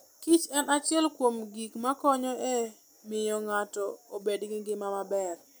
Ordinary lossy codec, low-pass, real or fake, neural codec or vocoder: none; none; real; none